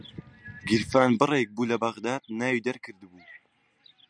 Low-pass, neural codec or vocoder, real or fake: 9.9 kHz; none; real